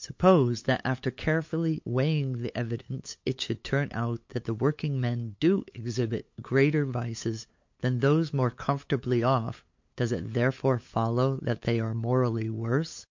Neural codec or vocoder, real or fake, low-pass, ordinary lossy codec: codec, 16 kHz, 8 kbps, FunCodec, trained on LibriTTS, 25 frames a second; fake; 7.2 kHz; MP3, 48 kbps